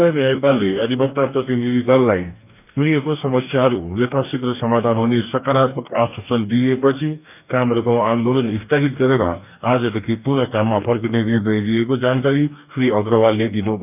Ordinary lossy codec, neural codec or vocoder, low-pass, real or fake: none; codec, 44.1 kHz, 2.6 kbps, DAC; 3.6 kHz; fake